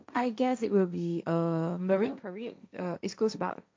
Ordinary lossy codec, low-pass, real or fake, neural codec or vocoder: none; none; fake; codec, 16 kHz, 1.1 kbps, Voila-Tokenizer